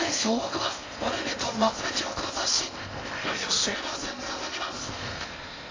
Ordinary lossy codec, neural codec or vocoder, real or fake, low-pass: AAC, 32 kbps; codec, 16 kHz in and 24 kHz out, 0.6 kbps, FocalCodec, streaming, 4096 codes; fake; 7.2 kHz